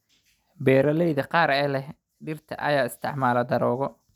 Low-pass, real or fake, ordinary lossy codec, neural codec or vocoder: 19.8 kHz; real; none; none